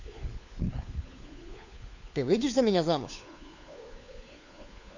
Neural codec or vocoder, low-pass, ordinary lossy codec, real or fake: codec, 16 kHz, 4 kbps, FunCodec, trained on LibriTTS, 50 frames a second; 7.2 kHz; none; fake